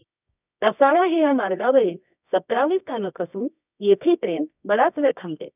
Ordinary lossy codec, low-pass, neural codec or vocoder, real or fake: none; 3.6 kHz; codec, 24 kHz, 0.9 kbps, WavTokenizer, medium music audio release; fake